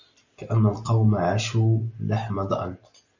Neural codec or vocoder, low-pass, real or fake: none; 7.2 kHz; real